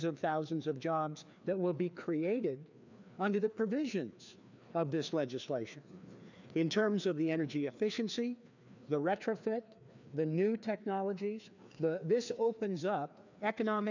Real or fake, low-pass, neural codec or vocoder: fake; 7.2 kHz; codec, 16 kHz, 2 kbps, FreqCodec, larger model